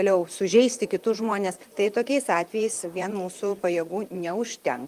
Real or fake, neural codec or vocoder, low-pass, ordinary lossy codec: fake; vocoder, 44.1 kHz, 128 mel bands, Pupu-Vocoder; 14.4 kHz; Opus, 32 kbps